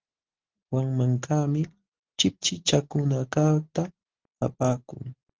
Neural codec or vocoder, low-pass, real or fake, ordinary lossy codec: none; 7.2 kHz; real; Opus, 16 kbps